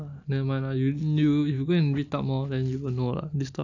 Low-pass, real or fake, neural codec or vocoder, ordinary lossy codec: 7.2 kHz; real; none; none